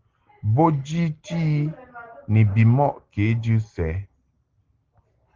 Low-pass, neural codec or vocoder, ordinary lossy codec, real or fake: 7.2 kHz; none; Opus, 16 kbps; real